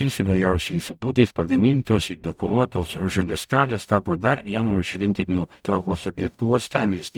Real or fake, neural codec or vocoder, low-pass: fake; codec, 44.1 kHz, 0.9 kbps, DAC; 19.8 kHz